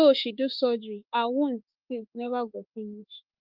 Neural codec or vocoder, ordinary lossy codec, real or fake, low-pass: autoencoder, 48 kHz, 32 numbers a frame, DAC-VAE, trained on Japanese speech; Opus, 32 kbps; fake; 5.4 kHz